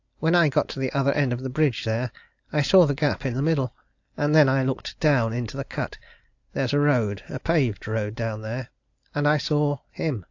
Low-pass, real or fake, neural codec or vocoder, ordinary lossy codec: 7.2 kHz; real; none; AAC, 48 kbps